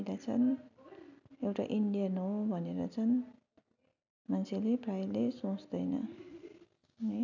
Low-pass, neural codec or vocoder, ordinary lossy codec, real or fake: 7.2 kHz; none; none; real